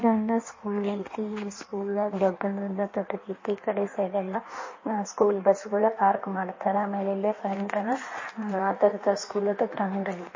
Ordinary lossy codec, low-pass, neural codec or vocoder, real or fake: MP3, 32 kbps; 7.2 kHz; codec, 16 kHz in and 24 kHz out, 1.1 kbps, FireRedTTS-2 codec; fake